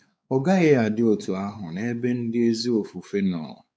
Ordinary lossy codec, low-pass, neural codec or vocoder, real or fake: none; none; codec, 16 kHz, 4 kbps, X-Codec, WavLM features, trained on Multilingual LibriSpeech; fake